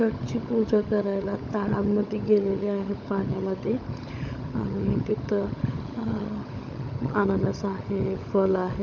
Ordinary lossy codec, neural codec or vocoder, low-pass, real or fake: none; codec, 16 kHz, 16 kbps, FunCodec, trained on LibriTTS, 50 frames a second; none; fake